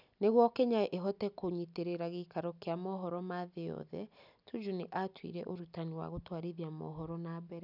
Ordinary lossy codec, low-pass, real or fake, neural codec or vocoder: none; 5.4 kHz; real; none